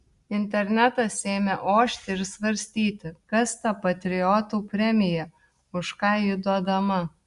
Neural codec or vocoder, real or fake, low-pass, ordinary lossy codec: none; real; 10.8 kHz; Opus, 64 kbps